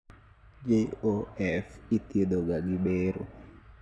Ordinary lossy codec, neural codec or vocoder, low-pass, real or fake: none; none; none; real